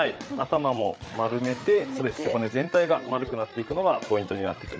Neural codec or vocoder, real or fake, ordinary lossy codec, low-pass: codec, 16 kHz, 8 kbps, FreqCodec, larger model; fake; none; none